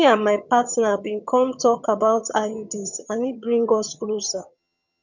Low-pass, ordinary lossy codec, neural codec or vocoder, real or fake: 7.2 kHz; none; vocoder, 22.05 kHz, 80 mel bands, HiFi-GAN; fake